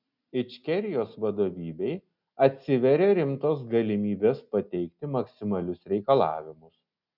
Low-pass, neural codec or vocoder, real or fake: 5.4 kHz; none; real